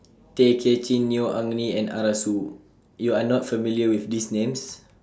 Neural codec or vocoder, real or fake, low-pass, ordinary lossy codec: none; real; none; none